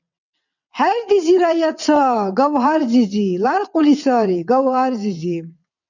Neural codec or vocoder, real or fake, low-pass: vocoder, 22.05 kHz, 80 mel bands, WaveNeXt; fake; 7.2 kHz